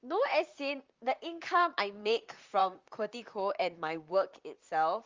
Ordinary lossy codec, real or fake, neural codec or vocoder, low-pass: Opus, 32 kbps; fake; vocoder, 44.1 kHz, 128 mel bands, Pupu-Vocoder; 7.2 kHz